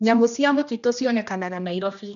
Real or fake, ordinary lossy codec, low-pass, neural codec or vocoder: fake; none; 7.2 kHz; codec, 16 kHz, 1 kbps, X-Codec, HuBERT features, trained on general audio